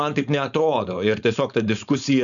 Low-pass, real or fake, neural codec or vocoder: 7.2 kHz; fake; codec, 16 kHz, 4.8 kbps, FACodec